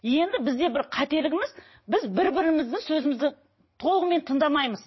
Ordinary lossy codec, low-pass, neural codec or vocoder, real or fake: MP3, 24 kbps; 7.2 kHz; vocoder, 22.05 kHz, 80 mel bands, WaveNeXt; fake